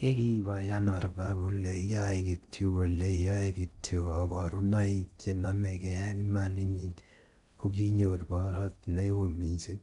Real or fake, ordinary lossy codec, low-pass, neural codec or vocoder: fake; none; 10.8 kHz; codec, 16 kHz in and 24 kHz out, 0.6 kbps, FocalCodec, streaming, 4096 codes